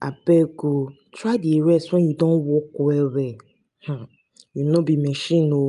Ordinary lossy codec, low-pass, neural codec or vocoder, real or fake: none; 10.8 kHz; none; real